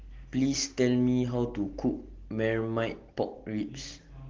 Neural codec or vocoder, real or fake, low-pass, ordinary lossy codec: none; real; 7.2 kHz; Opus, 16 kbps